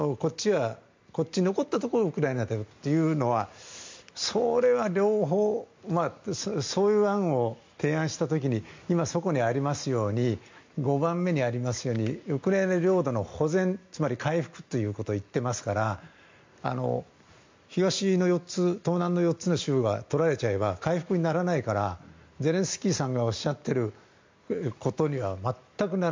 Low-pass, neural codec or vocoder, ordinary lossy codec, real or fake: 7.2 kHz; none; MP3, 64 kbps; real